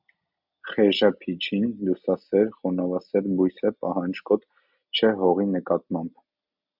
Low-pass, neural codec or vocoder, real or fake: 5.4 kHz; none; real